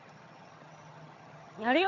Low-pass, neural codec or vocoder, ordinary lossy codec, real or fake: 7.2 kHz; vocoder, 22.05 kHz, 80 mel bands, HiFi-GAN; none; fake